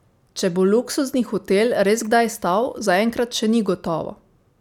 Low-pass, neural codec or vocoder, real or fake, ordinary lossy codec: 19.8 kHz; none; real; none